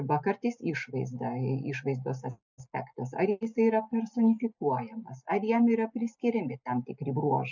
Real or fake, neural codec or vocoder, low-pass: real; none; 7.2 kHz